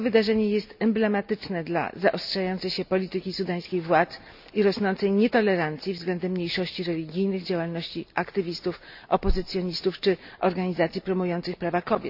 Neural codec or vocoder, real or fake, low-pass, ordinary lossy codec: none; real; 5.4 kHz; none